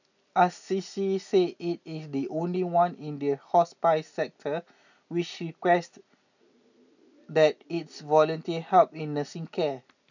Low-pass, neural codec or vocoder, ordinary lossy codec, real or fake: 7.2 kHz; none; none; real